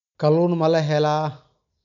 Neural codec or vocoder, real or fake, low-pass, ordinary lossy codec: none; real; 7.2 kHz; none